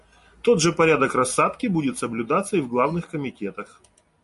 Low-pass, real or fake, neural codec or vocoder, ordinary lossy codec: 14.4 kHz; real; none; MP3, 48 kbps